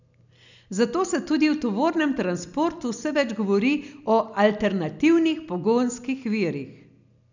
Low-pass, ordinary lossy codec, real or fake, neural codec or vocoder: 7.2 kHz; none; real; none